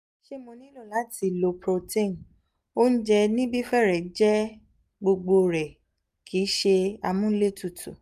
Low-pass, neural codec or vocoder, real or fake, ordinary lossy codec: 14.4 kHz; none; real; none